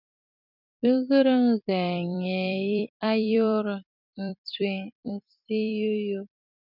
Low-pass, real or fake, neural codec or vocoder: 5.4 kHz; fake; vocoder, 44.1 kHz, 128 mel bands every 256 samples, BigVGAN v2